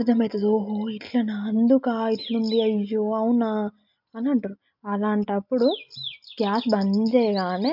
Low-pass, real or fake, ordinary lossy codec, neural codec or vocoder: 5.4 kHz; real; MP3, 48 kbps; none